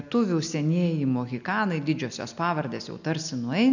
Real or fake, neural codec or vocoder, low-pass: real; none; 7.2 kHz